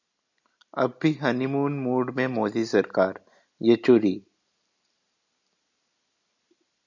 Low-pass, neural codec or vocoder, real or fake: 7.2 kHz; none; real